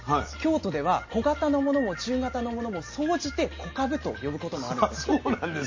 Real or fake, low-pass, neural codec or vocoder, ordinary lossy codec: real; 7.2 kHz; none; MP3, 32 kbps